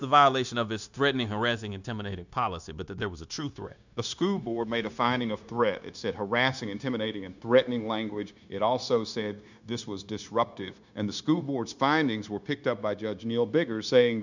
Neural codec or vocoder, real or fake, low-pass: codec, 16 kHz, 0.9 kbps, LongCat-Audio-Codec; fake; 7.2 kHz